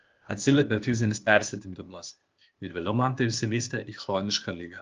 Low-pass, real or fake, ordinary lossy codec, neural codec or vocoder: 7.2 kHz; fake; Opus, 32 kbps; codec, 16 kHz, 0.8 kbps, ZipCodec